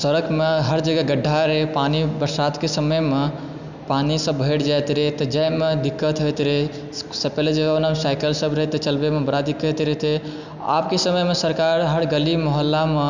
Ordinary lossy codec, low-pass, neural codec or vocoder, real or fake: none; 7.2 kHz; none; real